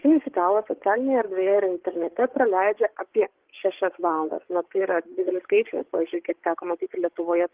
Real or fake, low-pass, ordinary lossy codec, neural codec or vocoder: fake; 3.6 kHz; Opus, 16 kbps; codec, 16 kHz, 8 kbps, FreqCodec, larger model